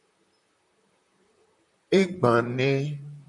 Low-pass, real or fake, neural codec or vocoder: 10.8 kHz; fake; vocoder, 44.1 kHz, 128 mel bands, Pupu-Vocoder